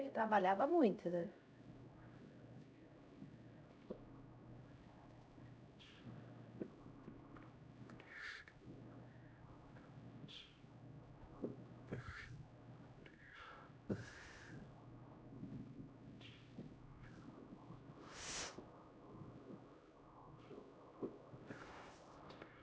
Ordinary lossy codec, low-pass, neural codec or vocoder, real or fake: none; none; codec, 16 kHz, 0.5 kbps, X-Codec, HuBERT features, trained on LibriSpeech; fake